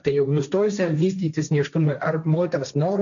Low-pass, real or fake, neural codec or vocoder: 7.2 kHz; fake; codec, 16 kHz, 1.1 kbps, Voila-Tokenizer